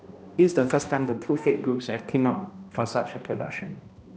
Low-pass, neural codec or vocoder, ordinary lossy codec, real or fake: none; codec, 16 kHz, 1 kbps, X-Codec, HuBERT features, trained on balanced general audio; none; fake